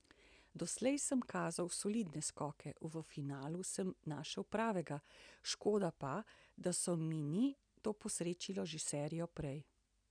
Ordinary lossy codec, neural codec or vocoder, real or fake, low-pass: none; none; real; 9.9 kHz